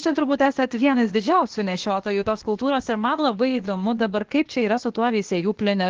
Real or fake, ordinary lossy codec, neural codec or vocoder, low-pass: fake; Opus, 16 kbps; codec, 16 kHz, 0.8 kbps, ZipCodec; 7.2 kHz